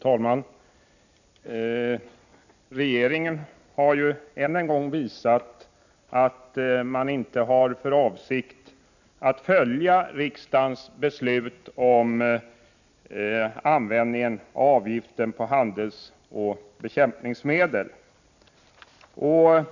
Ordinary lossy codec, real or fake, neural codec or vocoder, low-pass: none; real; none; 7.2 kHz